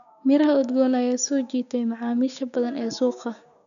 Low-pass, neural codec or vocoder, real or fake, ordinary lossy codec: 7.2 kHz; codec, 16 kHz, 6 kbps, DAC; fake; none